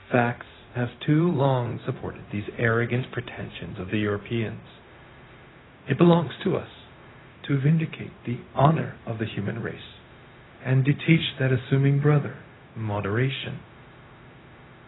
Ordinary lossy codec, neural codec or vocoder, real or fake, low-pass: AAC, 16 kbps; codec, 16 kHz, 0.4 kbps, LongCat-Audio-Codec; fake; 7.2 kHz